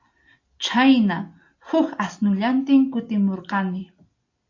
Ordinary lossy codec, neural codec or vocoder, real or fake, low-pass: AAC, 48 kbps; none; real; 7.2 kHz